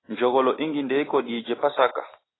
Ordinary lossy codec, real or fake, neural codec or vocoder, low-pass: AAC, 16 kbps; real; none; 7.2 kHz